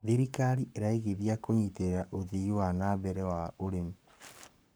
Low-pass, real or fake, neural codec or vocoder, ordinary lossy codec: none; fake; codec, 44.1 kHz, 7.8 kbps, Pupu-Codec; none